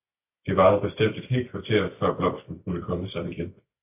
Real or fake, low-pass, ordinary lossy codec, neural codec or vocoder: real; 3.6 kHz; AAC, 32 kbps; none